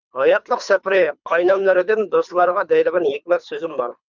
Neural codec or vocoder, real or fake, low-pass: codec, 24 kHz, 3 kbps, HILCodec; fake; 7.2 kHz